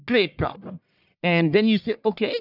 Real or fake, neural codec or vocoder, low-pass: fake; codec, 44.1 kHz, 1.7 kbps, Pupu-Codec; 5.4 kHz